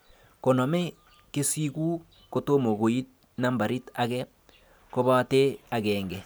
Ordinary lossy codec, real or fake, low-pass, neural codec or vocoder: none; real; none; none